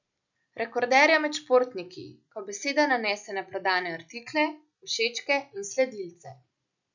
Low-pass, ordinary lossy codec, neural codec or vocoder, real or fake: 7.2 kHz; none; none; real